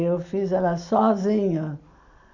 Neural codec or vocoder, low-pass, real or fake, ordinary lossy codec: none; 7.2 kHz; real; none